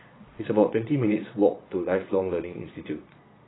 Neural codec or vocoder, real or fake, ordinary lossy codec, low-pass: vocoder, 22.05 kHz, 80 mel bands, Vocos; fake; AAC, 16 kbps; 7.2 kHz